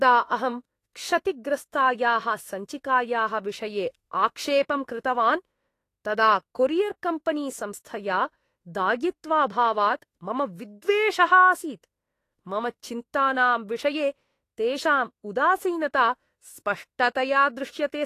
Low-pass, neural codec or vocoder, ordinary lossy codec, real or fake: 14.4 kHz; autoencoder, 48 kHz, 32 numbers a frame, DAC-VAE, trained on Japanese speech; AAC, 48 kbps; fake